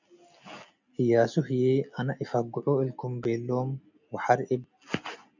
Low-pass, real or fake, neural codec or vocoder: 7.2 kHz; real; none